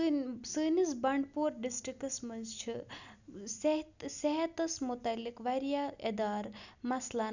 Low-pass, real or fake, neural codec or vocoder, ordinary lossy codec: 7.2 kHz; real; none; none